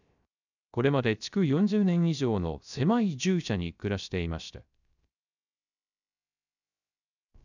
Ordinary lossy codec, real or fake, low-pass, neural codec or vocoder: none; fake; 7.2 kHz; codec, 16 kHz, 0.3 kbps, FocalCodec